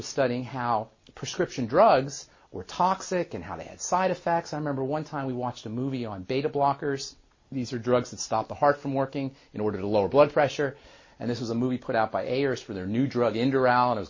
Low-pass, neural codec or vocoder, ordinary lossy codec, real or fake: 7.2 kHz; none; MP3, 32 kbps; real